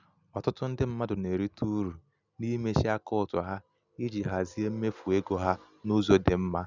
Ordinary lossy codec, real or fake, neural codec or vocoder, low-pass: none; real; none; 7.2 kHz